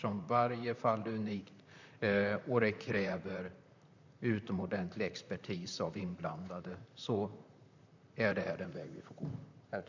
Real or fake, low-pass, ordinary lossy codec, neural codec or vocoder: fake; 7.2 kHz; none; vocoder, 44.1 kHz, 128 mel bands, Pupu-Vocoder